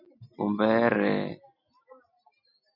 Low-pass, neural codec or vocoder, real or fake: 5.4 kHz; none; real